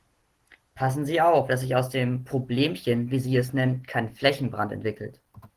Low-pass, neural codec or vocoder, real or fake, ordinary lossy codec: 14.4 kHz; none; real; Opus, 16 kbps